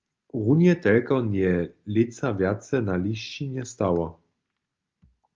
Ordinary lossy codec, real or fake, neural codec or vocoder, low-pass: Opus, 32 kbps; real; none; 7.2 kHz